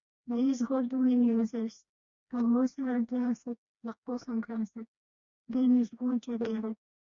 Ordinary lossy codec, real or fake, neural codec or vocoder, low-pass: Opus, 64 kbps; fake; codec, 16 kHz, 1 kbps, FreqCodec, smaller model; 7.2 kHz